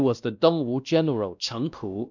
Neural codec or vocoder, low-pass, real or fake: codec, 16 kHz, 1 kbps, X-Codec, WavLM features, trained on Multilingual LibriSpeech; 7.2 kHz; fake